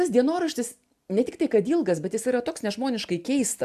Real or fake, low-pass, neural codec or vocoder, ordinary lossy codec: real; 14.4 kHz; none; AAC, 96 kbps